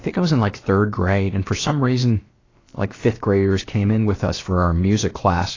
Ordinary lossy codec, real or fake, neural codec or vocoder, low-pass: AAC, 32 kbps; fake; codec, 16 kHz, about 1 kbps, DyCAST, with the encoder's durations; 7.2 kHz